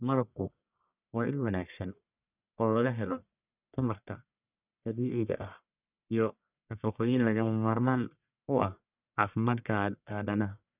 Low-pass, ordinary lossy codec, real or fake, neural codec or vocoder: 3.6 kHz; none; fake; codec, 44.1 kHz, 1.7 kbps, Pupu-Codec